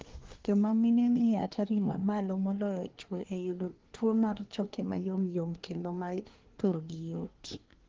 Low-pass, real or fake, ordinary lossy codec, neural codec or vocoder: 7.2 kHz; fake; Opus, 16 kbps; codec, 24 kHz, 1 kbps, SNAC